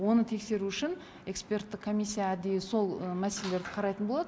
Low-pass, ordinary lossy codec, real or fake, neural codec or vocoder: none; none; real; none